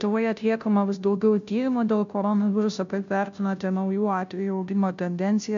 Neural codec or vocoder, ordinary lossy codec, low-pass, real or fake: codec, 16 kHz, 0.5 kbps, FunCodec, trained on Chinese and English, 25 frames a second; MP3, 64 kbps; 7.2 kHz; fake